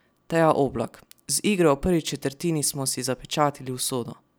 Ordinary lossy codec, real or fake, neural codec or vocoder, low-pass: none; real; none; none